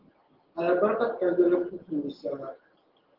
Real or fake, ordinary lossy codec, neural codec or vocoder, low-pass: real; Opus, 16 kbps; none; 5.4 kHz